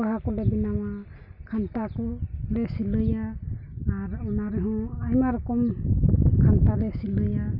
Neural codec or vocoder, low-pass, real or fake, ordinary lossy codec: none; 5.4 kHz; real; none